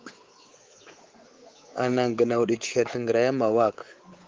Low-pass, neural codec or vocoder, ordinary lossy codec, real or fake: 7.2 kHz; codec, 24 kHz, 3.1 kbps, DualCodec; Opus, 16 kbps; fake